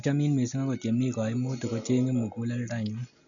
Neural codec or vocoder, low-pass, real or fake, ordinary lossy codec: none; 7.2 kHz; real; none